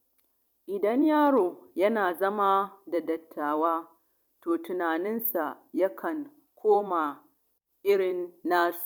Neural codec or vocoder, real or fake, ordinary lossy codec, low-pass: vocoder, 44.1 kHz, 128 mel bands every 256 samples, BigVGAN v2; fake; none; 19.8 kHz